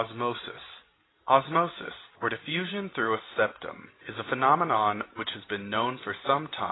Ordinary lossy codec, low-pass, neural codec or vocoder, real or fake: AAC, 16 kbps; 7.2 kHz; none; real